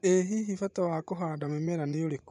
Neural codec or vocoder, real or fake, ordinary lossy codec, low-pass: vocoder, 24 kHz, 100 mel bands, Vocos; fake; none; 10.8 kHz